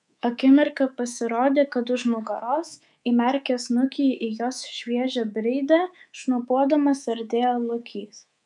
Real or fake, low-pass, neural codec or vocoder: fake; 10.8 kHz; codec, 24 kHz, 3.1 kbps, DualCodec